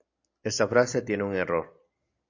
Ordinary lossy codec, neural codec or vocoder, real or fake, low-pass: AAC, 48 kbps; none; real; 7.2 kHz